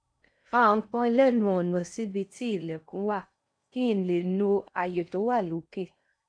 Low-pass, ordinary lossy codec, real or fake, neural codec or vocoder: 9.9 kHz; none; fake; codec, 16 kHz in and 24 kHz out, 0.6 kbps, FocalCodec, streaming, 4096 codes